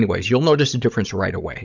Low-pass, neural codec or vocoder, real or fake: 7.2 kHz; codec, 16 kHz, 16 kbps, FunCodec, trained on Chinese and English, 50 frames a second; fake